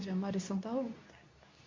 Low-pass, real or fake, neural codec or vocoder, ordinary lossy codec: 7.2 kHz; fake; codec, 24 kHz, 0.9 kbps, WavTokenizer, medium speech release version 2; MP3, 48 kbps